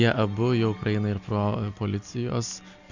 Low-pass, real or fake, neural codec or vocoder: 7.2 kHz; real; none